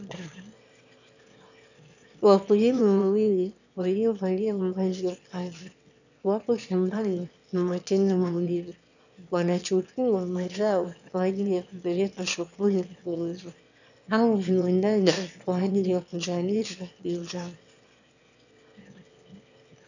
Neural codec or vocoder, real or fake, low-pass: autoencoder, 22.05 kHz, a latent of 192 numbers a frame, VITS, trained on one speaker; fake; 7.2 kHz